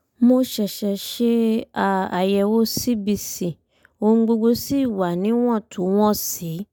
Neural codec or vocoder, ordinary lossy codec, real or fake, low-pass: none; none; real; none